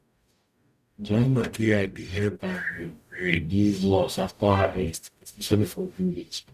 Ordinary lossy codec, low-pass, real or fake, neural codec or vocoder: none; 14.4 kHz; fake; codec, 44.1 kHz, 0.9 kbps, DAC